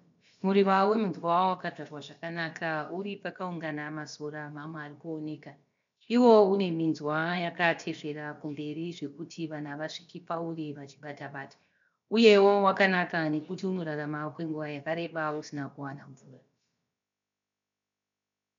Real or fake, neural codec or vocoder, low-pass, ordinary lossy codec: fake; codec, 16 kHz, about 1 kbps, DyCAST, with the encoder's durations; 7.2 kHz; MP3, 64 kbps